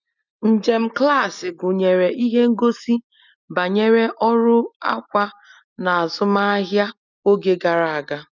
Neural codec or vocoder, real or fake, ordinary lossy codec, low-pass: none; real; none; 7.2 kHz